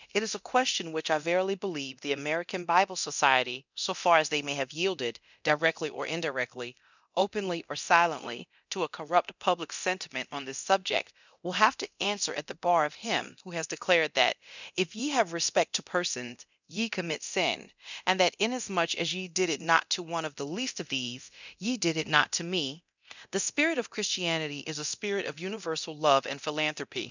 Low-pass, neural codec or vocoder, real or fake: 7.2 kHz; codec, 24 kHz, 0.9 kbps, DualCodec; fake